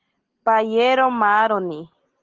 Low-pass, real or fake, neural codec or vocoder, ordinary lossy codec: 7.2 kHz; real; none; Opus, 16 kbps